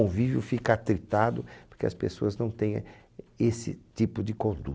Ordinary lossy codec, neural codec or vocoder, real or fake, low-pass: none; none; real; none